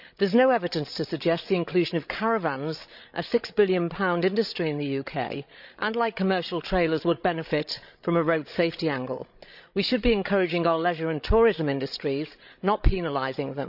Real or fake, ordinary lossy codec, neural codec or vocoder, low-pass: fake; none; codec, 16 kHz, 16 kbps, FreqCodec, larger model; 5.4 kHz